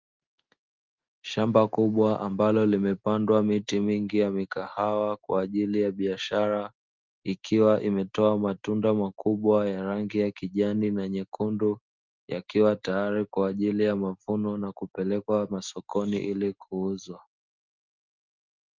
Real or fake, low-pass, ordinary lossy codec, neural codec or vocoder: real; 7.2 kHz; Opus, 32 kbps; none